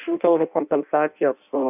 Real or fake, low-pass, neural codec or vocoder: fake; 3.6 kHz; codec, 16 kHz in and 24 kHz out, 0.6 kbps, FireRedTTS-2 codec